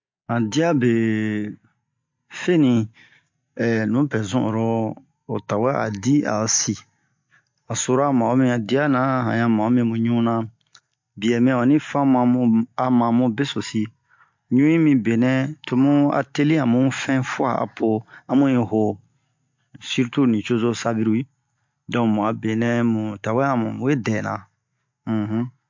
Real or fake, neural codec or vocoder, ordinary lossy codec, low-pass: real; none; MP3, 48 kbps; 7.2 kHz